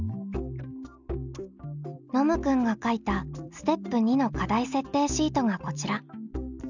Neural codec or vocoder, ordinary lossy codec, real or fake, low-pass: none; none; real; 7.2 kHz